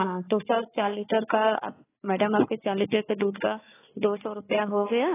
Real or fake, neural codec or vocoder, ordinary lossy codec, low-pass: fake; codec, 16 kHz, 4 kbps, FunCodec, trained on Chinese and English, 50 frames a second; AAC, 16 kbps; 3.6 kHz